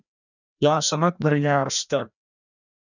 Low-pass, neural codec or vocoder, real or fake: 7.2 kHz; codec, 16 kHz, 1 kbps, FreqCodec, larger model; fake